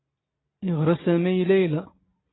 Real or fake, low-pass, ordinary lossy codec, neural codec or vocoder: real; 7.2 kHz; AAC, 16 kbps; none